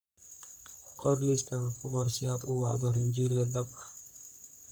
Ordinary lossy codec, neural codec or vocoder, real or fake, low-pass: none; codec, 44.1 kHz, 3.4 kbps, Pupu-Codec; fake; none